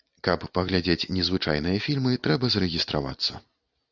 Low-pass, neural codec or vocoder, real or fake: 7.2 kHz; none; real